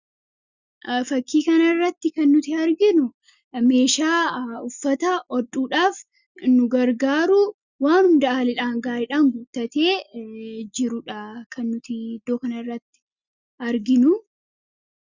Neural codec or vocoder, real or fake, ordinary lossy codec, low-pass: none; real; Opus, 64 kbps; 7.2 kHz